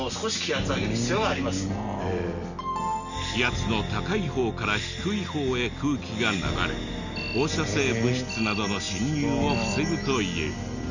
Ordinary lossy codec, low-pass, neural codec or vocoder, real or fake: none; 7.2 kHz; none; real